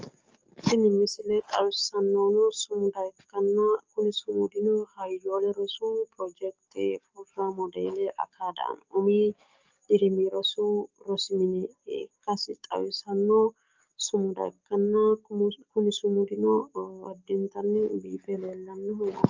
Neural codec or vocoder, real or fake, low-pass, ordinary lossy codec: codec, 44.1 kHz, 7.8 kbps, DAC; fake; 7.2 kHz; Opus, 24 kbps